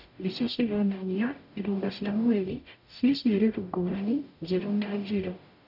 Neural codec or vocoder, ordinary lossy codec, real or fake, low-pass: codec, 44.1 kHz, 0.9 kbps, DAC; none; fake; 5.4 kHz